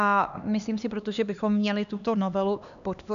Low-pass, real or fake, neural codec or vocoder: 7.2 kHz; fake; codec, 16 kHz, 2 kbps, X-Codec, HuBERT features, trained on LibriSpeech